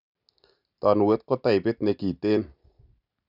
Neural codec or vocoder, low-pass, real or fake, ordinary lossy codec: none; 5.4 kHz; real; none